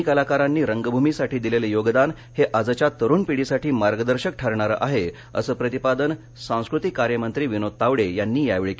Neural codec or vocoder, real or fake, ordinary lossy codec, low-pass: none; real; none; none